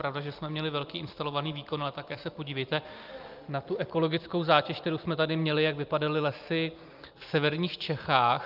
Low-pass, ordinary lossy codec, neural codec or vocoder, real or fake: 5.4 kHz; Opus, 32 kbps; none; real